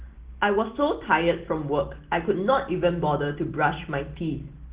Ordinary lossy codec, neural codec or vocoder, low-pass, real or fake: Opus, 16 kbps; none; 3.6 kHz; real